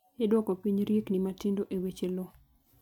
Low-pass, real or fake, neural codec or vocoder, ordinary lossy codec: 19.8 kHz; real; none; MP3, 96 kbps